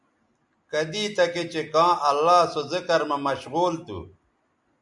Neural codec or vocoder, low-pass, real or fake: none; 10.8 kHz; real